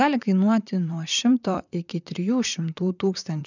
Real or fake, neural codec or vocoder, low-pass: fake; vocoder, 44.1 kHz, 128 mel bands, Pupu-Vocoder; 7.2 kHz